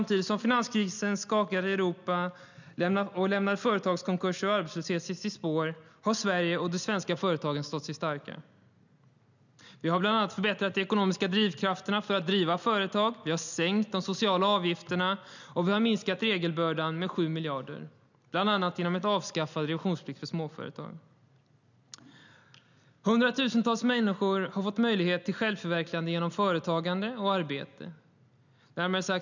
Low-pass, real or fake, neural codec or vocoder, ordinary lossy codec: 7.2 kHz; real; none; none